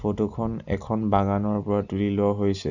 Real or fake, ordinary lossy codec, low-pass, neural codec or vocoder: real; none; 7.2 kHz; none